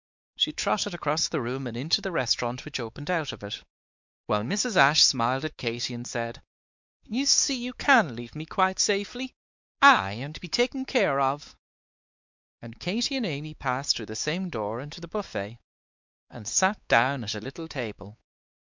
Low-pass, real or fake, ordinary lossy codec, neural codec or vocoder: 7.2 kHz; fake; MP3, 64 kbps; codec, 16 kHz, 4 kbps, X-Codec, WavLM features, trained on Multilingual LibriSpeech